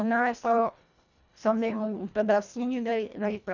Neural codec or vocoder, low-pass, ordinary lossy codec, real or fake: codec, 24 kHz, 1.5 kbps, HILCodec; 7.2 kHz; none; fake